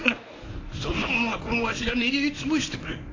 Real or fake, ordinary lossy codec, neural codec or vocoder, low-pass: fake; AAC, 32 kbps; codec, 24 kHz, 0.9 kbps, WavTokenizer, medium speech release version 1; 7.2 kHz